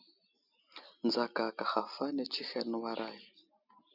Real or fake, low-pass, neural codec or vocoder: real; 5.4 kHz; none